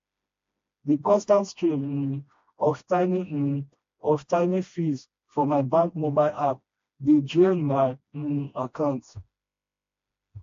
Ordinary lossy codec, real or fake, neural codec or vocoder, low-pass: AAC, 48 kbps; fake; codec, 16 kHz, 1 kbps, FreqCodec, smaller model; 7.2 kHz